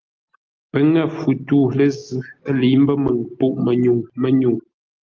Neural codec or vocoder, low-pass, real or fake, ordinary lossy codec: none; 7.2 kHz; real; Opus, 32 kbps